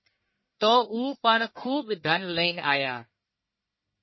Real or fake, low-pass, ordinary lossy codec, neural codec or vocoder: fake; 7.2 kHz; MP3, 24 kbps; codec, 44.1 kHz, 1.7 kbps, Pupu-Codec